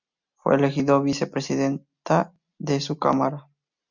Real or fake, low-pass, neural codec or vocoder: real; 7.2 kHz; none